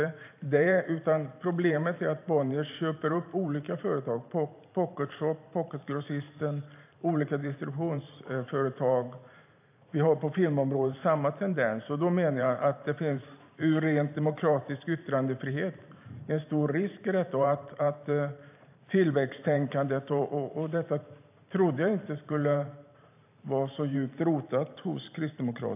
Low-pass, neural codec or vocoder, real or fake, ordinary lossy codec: 3.6 kHz; vocoder, 44.1 kHz, 128 mel bands every 512 samples, BigVGAN v2; fake; AAC, 32 kbps